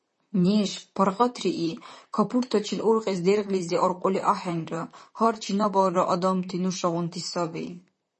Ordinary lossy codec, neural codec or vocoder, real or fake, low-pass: MP3, 32 kbps; vocoder, 44.1 kHz, 128 mel bands, Pupu-Vocoder; fake; 10.8 kHz